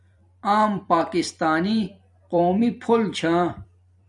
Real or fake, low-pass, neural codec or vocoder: fake; 10.8 kHz; vocoder, 44.1 kHz, 128 mel bands every 256 samples, BigVGAN v2